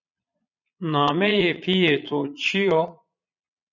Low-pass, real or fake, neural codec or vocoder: 7.2 kHz; fake; vocoder, 22.05 kHz, 80 mel bands, Vocos